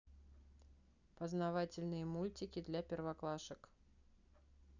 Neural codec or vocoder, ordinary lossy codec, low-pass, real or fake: none; none; 7.2 kHz; real